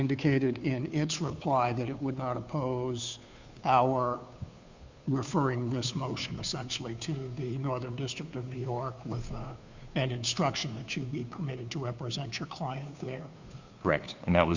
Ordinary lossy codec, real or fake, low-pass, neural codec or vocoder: Opus, 64 kbps; fake; 7.2 kHz; codec, 16 kHz, 2 kbps, FunCodec, trained on Chinese and English, 25 frames a second